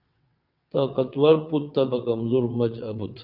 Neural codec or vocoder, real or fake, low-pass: vocoder, 22.05 kHz, 80 mel bands, WaveNeXt; fake; 5.4 kHz